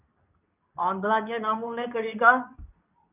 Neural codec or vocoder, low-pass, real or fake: codec, 24 kHz, 0.9 kbps, WavTokenizer, medium speech release version 2; 3.6 kHz; fake